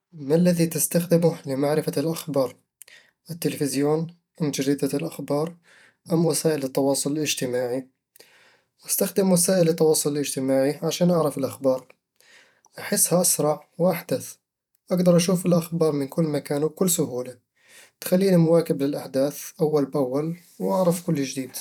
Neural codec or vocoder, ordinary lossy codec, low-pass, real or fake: vocoder, 44.1 kHz, 128 mel bands every 512 samples, BigVGAN v2; none; 19.8 kHz; fake